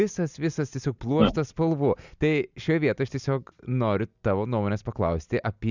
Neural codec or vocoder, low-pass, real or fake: none; 7.2 kHz; real